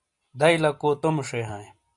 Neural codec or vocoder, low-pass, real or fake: none; 10.8 kHz; real